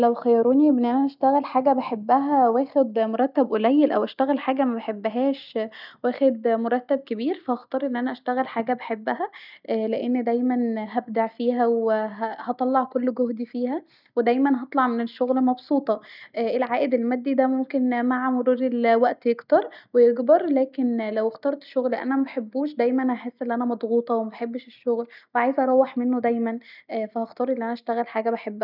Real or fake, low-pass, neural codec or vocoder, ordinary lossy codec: real; 5.4 kHz; none; none